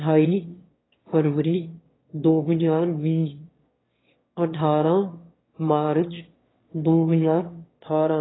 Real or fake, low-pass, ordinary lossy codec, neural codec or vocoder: fake; 7.2 kHz; AAC, 16 kbps; autoencoder, 22.05 kHz, a latent of 192 numbers a frame, VITS, trained on one speaker